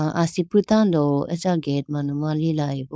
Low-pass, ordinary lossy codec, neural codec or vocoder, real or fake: none; none; codec, 16 kHz, 4.8 kbps, FACodec; fake